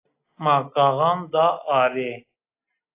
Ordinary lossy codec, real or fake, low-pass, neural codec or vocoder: AAC, 24 kbps; real; 3.6 kHz; none